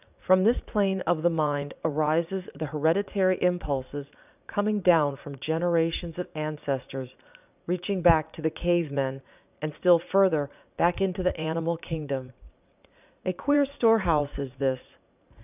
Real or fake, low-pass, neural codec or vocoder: fake; 3.6 kHz; vocoder, 44.1 kHz, 80 mel bands, Vocos